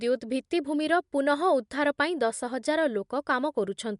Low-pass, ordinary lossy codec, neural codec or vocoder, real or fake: 10.8 kHz; MP3, 64 kbps; none; real